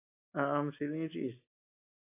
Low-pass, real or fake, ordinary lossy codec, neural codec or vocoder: 3.6 kHz; real; AAC, 24 kbps; none